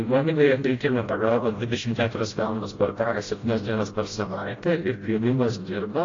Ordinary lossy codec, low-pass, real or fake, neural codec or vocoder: AAC, 32 kbps; 7.2 kHz; fake; codec, 16 kHz, 0.5 kbps, FreqCodec, smaller model